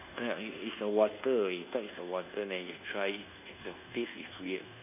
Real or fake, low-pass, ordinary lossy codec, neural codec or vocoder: fake; 3.6 kHz; none; codec, 24 kHz, 1.2 kbps, DualCodec